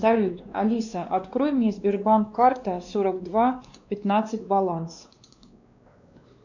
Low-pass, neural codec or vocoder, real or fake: 7.2 kHz; codec, 16 kHz, 2 kbps, X-Codec, WavLM features, trained on Multilingual LibriSpeech; fake